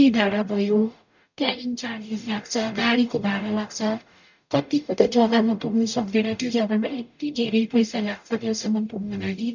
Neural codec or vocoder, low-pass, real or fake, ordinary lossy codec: codec, 44.1 kHz, 0.9 kbps, DAC; 7.2 kHz; fake; none